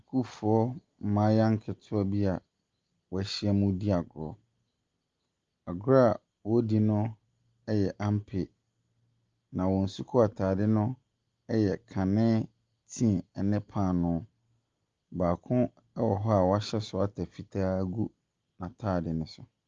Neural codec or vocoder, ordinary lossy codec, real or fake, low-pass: none; Opus, 32 kbps; real; 7.2 kHz